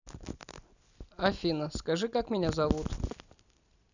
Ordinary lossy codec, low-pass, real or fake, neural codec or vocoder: none; 7.2 kHz; real; none